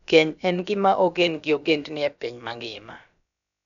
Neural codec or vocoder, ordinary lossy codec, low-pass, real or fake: codec, 16 kHz, about 1 kbps, DyCAST, with the encoder's durations; none; 7.2 kHz; fake